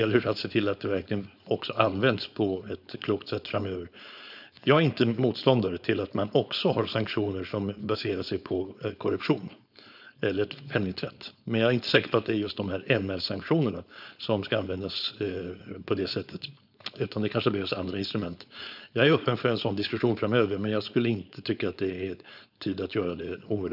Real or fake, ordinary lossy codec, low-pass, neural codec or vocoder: fake; none; 5.4 kHz; codec, 16 kHz, 4.8 kbps, FACodec